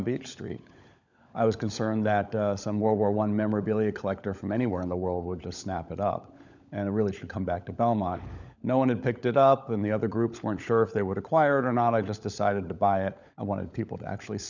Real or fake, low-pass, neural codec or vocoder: fake; 7.2 kHz; codec, 16 kHz, 16 kbps, FunCodec, trained on LibriTTS, 50 frames a second